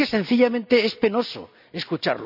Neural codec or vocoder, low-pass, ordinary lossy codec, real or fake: none; 5.4 kHz; none; real